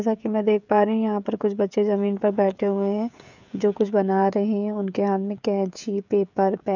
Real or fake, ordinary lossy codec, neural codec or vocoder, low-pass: fake; none; codec, 16 kHz, 16 kbps, FreqCodec, smaller model; 7.2 kHz